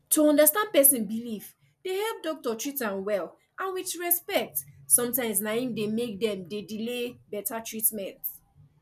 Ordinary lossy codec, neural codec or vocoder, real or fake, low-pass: none; none; real; 14.4 kHz